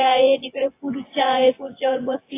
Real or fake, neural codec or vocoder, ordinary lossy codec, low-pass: fake; vocoder, 24 kHz, 100 mel bands, Vocos; AAC, 24 kbps; 3.6 kHz